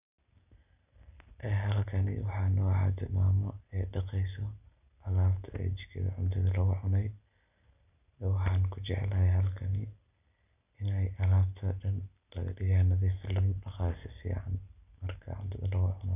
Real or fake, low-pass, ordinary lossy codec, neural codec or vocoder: real; 3.6 kHz; none; none